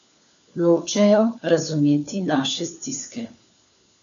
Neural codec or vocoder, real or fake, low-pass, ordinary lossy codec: codec, 16 kHz, 4 kbps, FunCodec, trained on LibriTTS, 50 frames a second; fake; 7.2 kHz; none